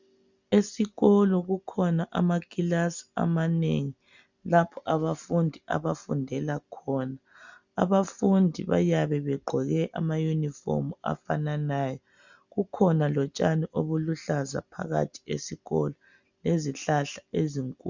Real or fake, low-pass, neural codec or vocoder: real; 7.2 kHz; none